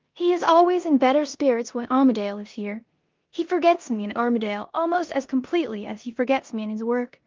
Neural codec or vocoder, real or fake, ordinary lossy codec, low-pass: codec, 16 kHz in and 24 kHz out, 0.9 kbps, LongCat-Audio-Codec, four codebook decoder; fake; Opus, 32 kbps; 7.2 kHz